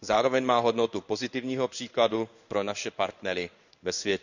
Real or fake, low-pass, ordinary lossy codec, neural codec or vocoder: fake; 7.2 kHz; none; codec, 16 kHz in and 24 kHz out, 1 kbps, XY-Tokenizer